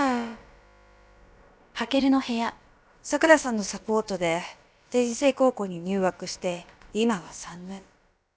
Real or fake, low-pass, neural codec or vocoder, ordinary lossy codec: fake; none; codec, 16 kHz, about 1 kbps, DyCAST, with the encoder's durations; none